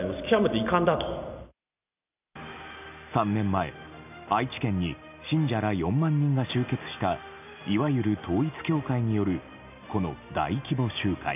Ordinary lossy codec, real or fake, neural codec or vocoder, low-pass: none; real; none; 3.6 kHz